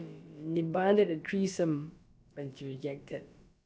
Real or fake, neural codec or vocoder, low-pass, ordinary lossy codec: fake; codec, 16 kHz, about 1 kbps, DyCAST, with the encoder's durations; none; none